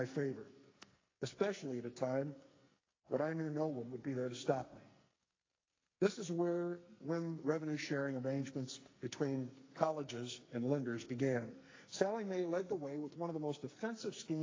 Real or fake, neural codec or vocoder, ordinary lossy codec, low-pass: fake; codec, 44.1 kHz, 2.6 kbps, SNAC; AAC, 32 kbps; 7.2 kHz